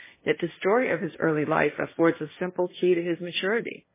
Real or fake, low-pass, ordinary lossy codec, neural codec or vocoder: fake; 3.6 kHz; MP3, 16 kbps; codec, 16 kHz, 1.1 kbps, Voila-Tokenizer